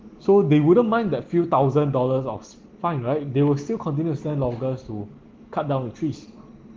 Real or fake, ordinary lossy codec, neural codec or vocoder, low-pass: real; Opus, 16 kbps; none; 7.2 kHz